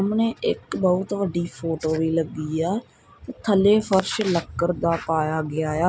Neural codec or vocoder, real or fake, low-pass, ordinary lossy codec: none; real; none; none